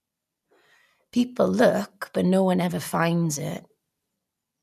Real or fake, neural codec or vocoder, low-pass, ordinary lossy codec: real; none; 14.4 kHz; none